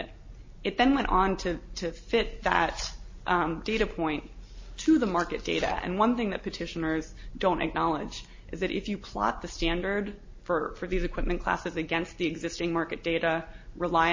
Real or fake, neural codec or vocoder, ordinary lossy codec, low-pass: real; none; MP3, 32 kbps; 7.2 kHz